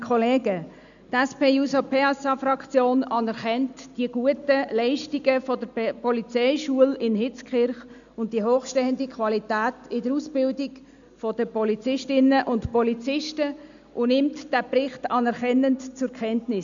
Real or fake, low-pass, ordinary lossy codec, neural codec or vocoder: real; 7.2 kHz; none; none